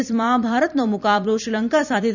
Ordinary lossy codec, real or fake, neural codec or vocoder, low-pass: none; fake; vocoder, 44.1 kHz, 128 mel bands every 256 samples, BigVGAN v2; 7.2 kHz